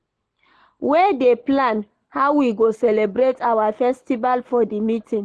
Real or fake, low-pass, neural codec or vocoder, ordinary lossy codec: real; 10.8 kHz; none; Opus, 16 kbps